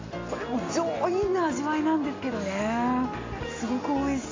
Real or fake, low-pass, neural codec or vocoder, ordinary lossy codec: real; 7.2 kHz; none; AAC, 32 kbps